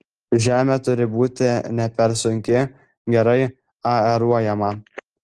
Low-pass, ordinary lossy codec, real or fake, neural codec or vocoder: 10.8 kHz; Opus, 16 kbps; real; none